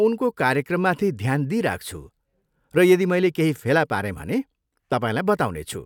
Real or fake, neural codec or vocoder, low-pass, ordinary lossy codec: real; none; 19.8 kHz; none